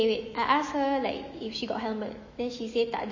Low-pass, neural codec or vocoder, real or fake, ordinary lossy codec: 7.2 kHz; autoencoder, 48 kHz, 128 numbers a frame, DAC-VAE, trained on Japanese speech; fake; MP3, 32 kbps